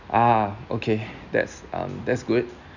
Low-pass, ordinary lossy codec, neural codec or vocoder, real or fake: 7.2 kHz; none; none; real